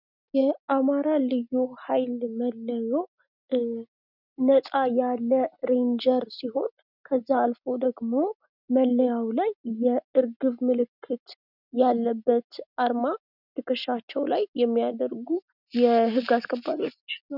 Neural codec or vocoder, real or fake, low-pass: none; real; 5.4 kHz